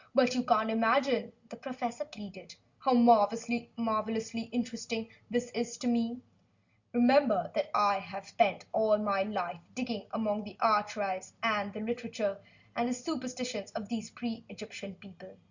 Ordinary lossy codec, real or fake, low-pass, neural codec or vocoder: Opus, 64 kbps; real; 7.2 kHz; none